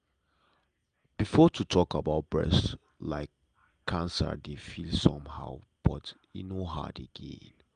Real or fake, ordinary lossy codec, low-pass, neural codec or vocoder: real; Opus, 32 kbps; 9.9 kHz; none